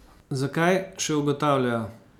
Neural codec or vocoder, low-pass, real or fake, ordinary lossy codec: none; 19.8 kHz; real; none